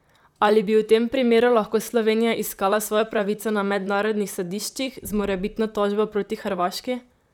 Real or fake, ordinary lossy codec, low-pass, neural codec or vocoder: fake; none; 19.8 kHz; vocoder, 44.1 kHz, 128 mel bands, Pupu-Vocoder